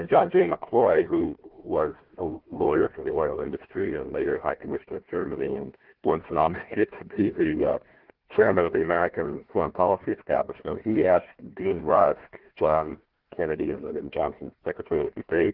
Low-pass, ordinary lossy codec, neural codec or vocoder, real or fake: 5.4 kHz; Opus, 16 kbps; codec, 16 kHz, 1 kbps, FunCodec, trained on Chinese and English, 50 frames a second; fake